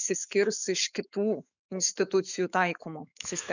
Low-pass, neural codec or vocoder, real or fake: 7.2 kHz; autoencoder, 48 kHz, 128 numbers a frame, DAC-VAE, trained on Japanese speech; fake